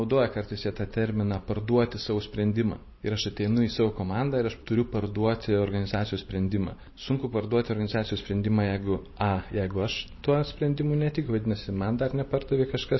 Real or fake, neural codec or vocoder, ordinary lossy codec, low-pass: fake; vocoder, 44.1 kHz, 128 mel bands every 512 samples, BigVGAN v2; MP3, 24 kbps; 7.2 kHz